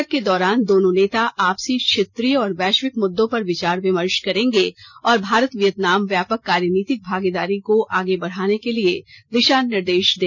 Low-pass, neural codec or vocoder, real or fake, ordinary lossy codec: 7.2 kHz; none; real; none